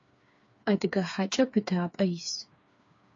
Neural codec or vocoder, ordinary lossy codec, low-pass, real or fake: codec, 16 kHz, 4 kbps, FreqCodec, smaller model; AAC, 48 kbps; 7.2 kHz; fake